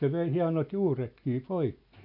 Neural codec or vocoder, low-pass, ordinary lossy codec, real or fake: none; 5.4 kHz; none; real